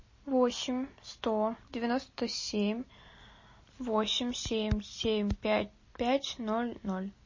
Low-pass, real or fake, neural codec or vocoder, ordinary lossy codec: 7.2 kHz; real; none; MP3, 32 kbps